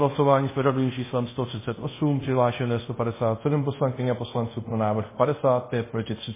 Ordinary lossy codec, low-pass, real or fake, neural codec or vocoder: MP3, 16 kbps; 3.6 kHz; fake; codec, 24 kHz, 0.5 kbps, DualCodec